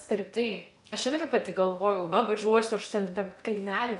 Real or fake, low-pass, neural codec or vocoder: fake; 10.8 kHz; codec, 16 kHz in and 24 kHz out, 0.8 kbps, FocalCodec, streaming, 65536 codes